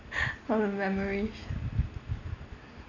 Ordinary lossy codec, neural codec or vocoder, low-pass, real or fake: none; none; 7.2 kHz; real